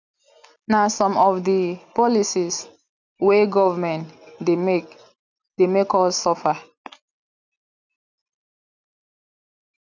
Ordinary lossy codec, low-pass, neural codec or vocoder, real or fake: none; 7.2 kHz; none; real